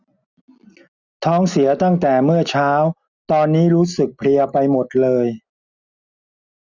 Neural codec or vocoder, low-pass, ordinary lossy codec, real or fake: none; 7.2 kHz; none; real